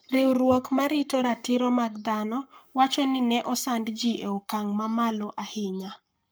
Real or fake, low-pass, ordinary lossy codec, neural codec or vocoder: fake; none; none; codec, 44.1 kHz, 7.8 kbps, Pupu-Codec